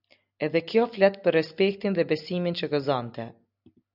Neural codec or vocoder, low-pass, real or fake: none; 5.4 kHz; real